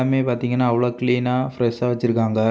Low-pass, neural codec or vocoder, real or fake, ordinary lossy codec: none; none; real; none